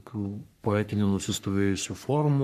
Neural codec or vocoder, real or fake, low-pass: codec, 44.1 kHz, 3.4 kbps, Pupu-Codec; fake; 14.4 kHz